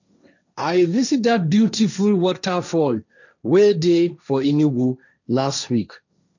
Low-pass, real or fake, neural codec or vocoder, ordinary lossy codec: 7.2 kHz; fake; codec, 16 kHz, 1.1 kbps, Voila-Tokenizer; none